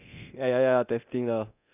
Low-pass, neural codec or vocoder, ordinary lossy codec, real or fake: 3.6 kHz; codec, 24 kHz, 0.9 kbps, DualCodec; none; fake